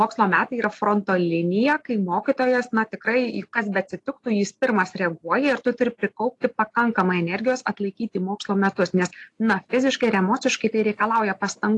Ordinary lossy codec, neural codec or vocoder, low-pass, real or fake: AAC, 48 kbps; none; 10.8 kHz; real